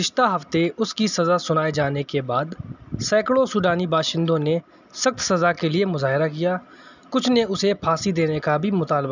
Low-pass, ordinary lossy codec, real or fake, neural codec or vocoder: 7.2 kHz; none; real; none